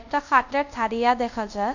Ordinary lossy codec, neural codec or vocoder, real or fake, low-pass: none; codec, 24 kHz, 0.5 kbps, DualCodec; fake; 7.2 kHz